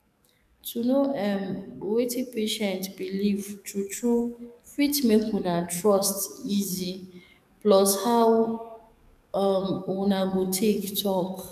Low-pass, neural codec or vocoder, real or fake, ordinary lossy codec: 14.4 kHz; codec, 44.1 kHz, 7.8 kbps, DAC; fake; none